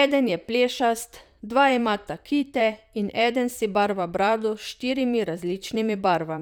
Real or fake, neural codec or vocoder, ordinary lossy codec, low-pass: fake; vocoder, 44.1 kHz, 128 mel bands, Pupu-Vocoder; none; 19.8 kHz